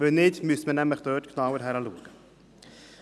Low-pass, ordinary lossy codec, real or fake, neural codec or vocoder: none; none; real; none